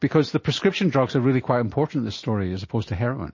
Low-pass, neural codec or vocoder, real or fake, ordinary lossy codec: 7.2 kHz; none; real; MP3, 32 kbps